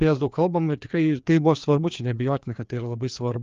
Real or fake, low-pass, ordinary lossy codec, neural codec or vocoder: fake; 7.2 kHz; Opus, 32 kbps; codec, 16 kHz, 2 kbps, FreqCodec, larger model